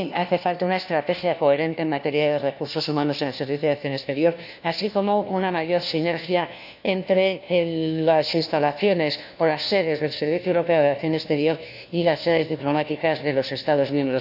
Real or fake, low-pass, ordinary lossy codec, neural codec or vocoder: fake; 5.4 kHz; none; codec, 16 kHz, 1 kbps, FunCodec, trained on LibriTTS, 50 frames a second